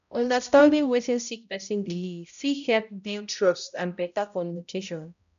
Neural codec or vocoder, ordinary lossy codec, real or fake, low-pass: codec, 16 kHz, 0.5 kbps, X-Codec, HuBERT features, trained on balanced general audio; none; fake; 7.2 kHz